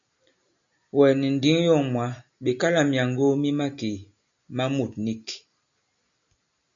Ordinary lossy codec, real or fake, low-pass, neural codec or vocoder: MP3, 64 kbps; real; 7.2 kHz; none